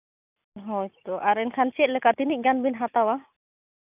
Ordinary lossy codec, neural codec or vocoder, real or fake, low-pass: none; none; real; 3.6 kHz